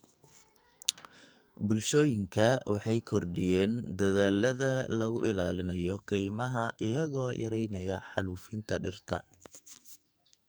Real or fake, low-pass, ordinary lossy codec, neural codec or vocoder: fake; none; none; codec, 44.1 kHz, 2.6 kbps, SNAC